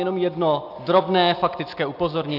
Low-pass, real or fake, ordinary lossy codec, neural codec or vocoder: 5.4 kHz; real; AAC, 48 kbps; none